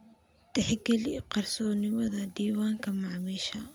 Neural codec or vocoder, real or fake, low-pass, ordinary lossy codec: none; real; none; none